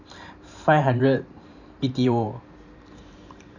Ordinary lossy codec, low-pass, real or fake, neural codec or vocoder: none; 7.2 kHz; fake; vocoder, 44.1 kHz, 128 mel bands every 512 samples, BigVGAN v2